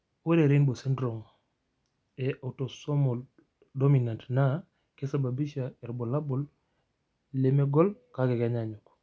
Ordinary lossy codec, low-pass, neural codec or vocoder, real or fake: none; none; none; real